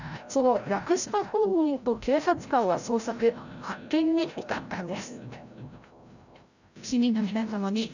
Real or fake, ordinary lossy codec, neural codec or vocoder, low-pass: fake; none; codec, 16 kHz, 0.5 kbps, FreqCodec, larger model; 7.2 kHz